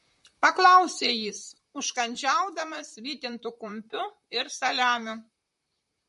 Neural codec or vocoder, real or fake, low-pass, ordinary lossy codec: vocoder, 44.1 kHz, 128 mel bands, Pupu-Vocoder; fake; 14.4 kHz; MP3, 48 kbps